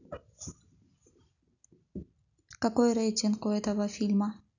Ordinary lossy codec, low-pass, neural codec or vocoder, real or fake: none; 7.2 kHz; none; real